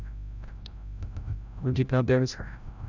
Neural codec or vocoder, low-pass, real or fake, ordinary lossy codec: codec, 16 kHz, 0.5 kbps, FreqCodec, larger model; 7.2 kHz; fake; none